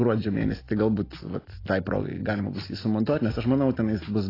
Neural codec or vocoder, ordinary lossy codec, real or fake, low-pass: none; AAC, 24 kbps; real; 5.4 kHz